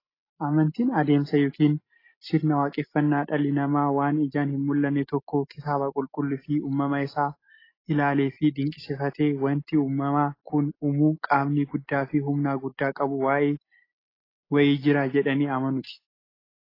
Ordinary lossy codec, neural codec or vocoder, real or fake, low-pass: AAC, 24 kbps; none; real; 5.4 kHz